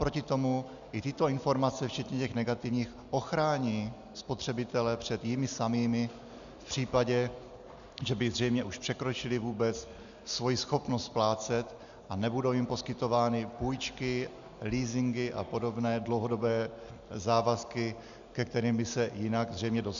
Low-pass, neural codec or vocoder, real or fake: 7.2 kHz; none; real